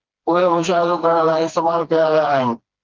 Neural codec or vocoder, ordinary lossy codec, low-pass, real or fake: codec, 16 kHz, 2 kbps, FreqCodec, smaller model; Opus, 32 kbps; 7.2 kHz; fake